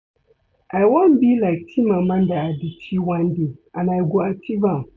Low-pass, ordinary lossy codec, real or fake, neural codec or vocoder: none; none; real; none